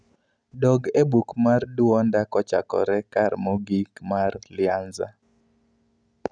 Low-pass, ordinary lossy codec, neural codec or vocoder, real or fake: 9.9 kHz; none; none; real